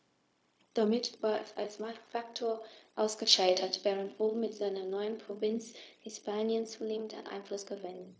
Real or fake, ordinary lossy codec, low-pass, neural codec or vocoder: fake; none; none; codec, 16 kHz, 0.4 kbps, LongCat-Audio-Codec